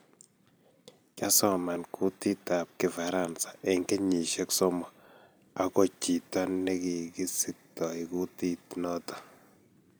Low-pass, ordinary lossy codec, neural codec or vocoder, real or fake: none; none; vocoder, 44.1 kHz, 128 mel bands every 256 samples, BigVGAN v2; fake